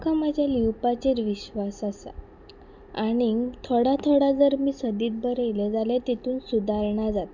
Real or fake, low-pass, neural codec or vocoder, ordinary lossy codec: real; 7.2 kHz; none; none